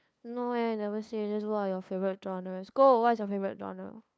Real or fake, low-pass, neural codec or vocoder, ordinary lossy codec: fake; none; codec, 16 kHz, 2 kbps, FunCodec, trained on LibriTTS, 25 frames a second; none